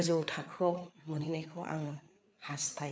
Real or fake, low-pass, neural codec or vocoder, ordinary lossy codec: fake; none; codec, 16 kHz, 4 kbps, FreqCodec, larger model; none